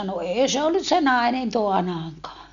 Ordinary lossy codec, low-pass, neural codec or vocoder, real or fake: none; 7.2 kHz; none; real